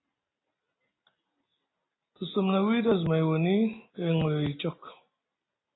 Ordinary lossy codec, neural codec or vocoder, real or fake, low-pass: AAC, 16 kbps; none; real; 7.2 kHz